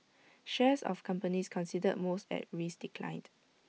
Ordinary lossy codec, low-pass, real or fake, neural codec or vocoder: none; none; real; none